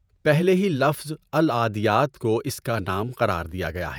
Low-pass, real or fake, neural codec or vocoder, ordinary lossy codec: none; fake; vocoder, 48 kHz, 128 mel bands, Vocos; none